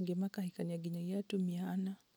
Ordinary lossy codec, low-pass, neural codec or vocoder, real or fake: none; none; none; real